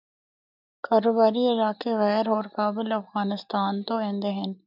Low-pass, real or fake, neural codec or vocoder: 5.4 kHz; real; none